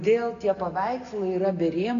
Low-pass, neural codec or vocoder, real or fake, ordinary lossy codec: 7.2 kHz; none; real; MP3, 96 kbps